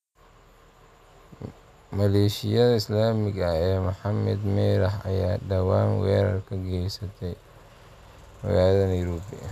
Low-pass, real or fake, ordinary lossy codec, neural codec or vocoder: 14.4 kHz; real; none; none